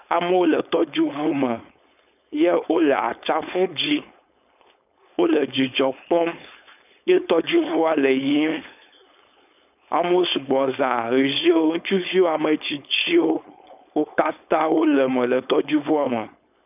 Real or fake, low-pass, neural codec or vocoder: fake; 3.6 kHz; codec, 16 kHz, 4.8 kbps, FACodec